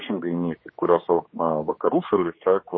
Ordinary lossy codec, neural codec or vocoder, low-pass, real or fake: MP3, 24 kbps; codec, 16 kHz, 2 kbps, X-Codec, HuBERT features, trained on general audio; 7.2 kHz; fake